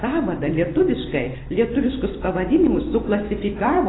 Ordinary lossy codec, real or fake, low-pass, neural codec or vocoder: AAC, 16 kbps; fake; 7.2 kHz; vocoder, 44.1 kHz, 128 mel bands every 256 samples, BigVGAN v2